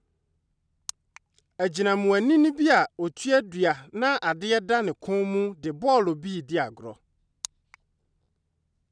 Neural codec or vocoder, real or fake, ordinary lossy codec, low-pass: none; real; none; 9.9 kHz